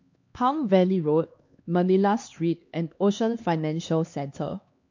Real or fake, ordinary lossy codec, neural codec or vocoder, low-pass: fake; MP3, 48 kbps; codec, 16 kHz, 2 kbps, X-Codec, HuBERT features, trained on LibriSpeech; 7.2 kHz